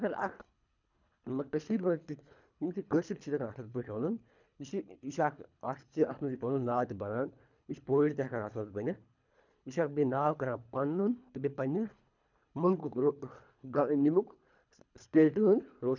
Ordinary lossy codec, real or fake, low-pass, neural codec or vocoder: none; fake; 7.2 kHz; codec, 24 kHz, 3 kbps, HILCodec